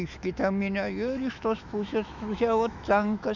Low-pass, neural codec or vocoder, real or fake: 7.2 kHz; autoencoder, 48 kHz, 128 numbers a frame, DAC-VAE, trained on Japanese speech; fake